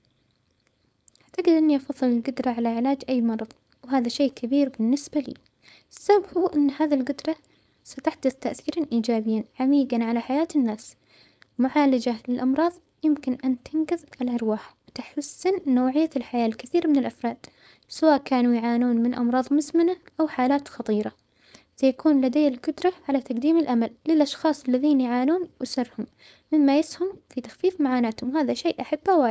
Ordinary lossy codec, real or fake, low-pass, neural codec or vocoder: none; fake; none; codec, 16 kHz, 4.8 kbps, FACodec